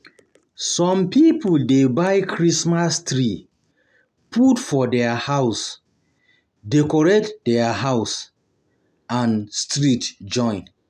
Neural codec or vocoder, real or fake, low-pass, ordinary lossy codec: none; real; 14.4 kHz; none